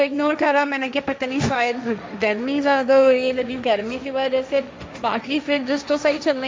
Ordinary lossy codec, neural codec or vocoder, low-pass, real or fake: none; codec, 16 kHz, 1.1 kbps, Voila-Tokenizer; none; fake